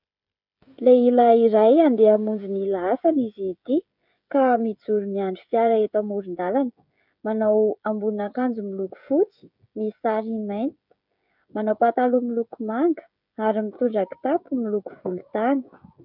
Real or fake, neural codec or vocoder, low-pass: fake; codec, 16 kHz, 16 kbps, FreqCodec, smaller model; 5.4 kHz